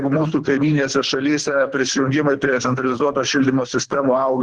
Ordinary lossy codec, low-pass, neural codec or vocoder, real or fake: Opus, 16 kbps; 9.9 kHz; codec, 24 kHz, 3 kbps, HILCodec; fake